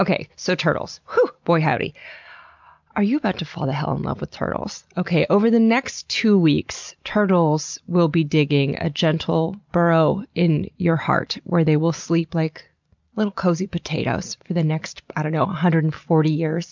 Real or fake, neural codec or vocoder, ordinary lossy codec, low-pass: real; none; MP3, 64 kbps; 7.2 kHz